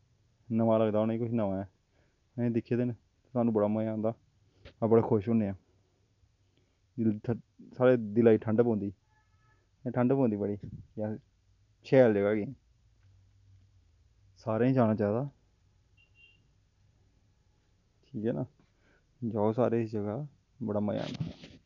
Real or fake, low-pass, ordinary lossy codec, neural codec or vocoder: real; 7.2 kHz; none; none